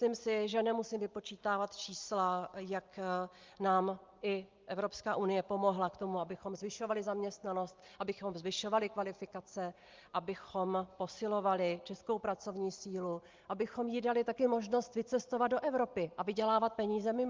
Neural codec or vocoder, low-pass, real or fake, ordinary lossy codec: none; 7.2 kHz; real; Opus, 32 kbps